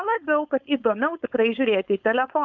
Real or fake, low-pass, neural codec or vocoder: fake; 7.2 kHz; codec, 16 kHz, 4.8 kbps, FACodec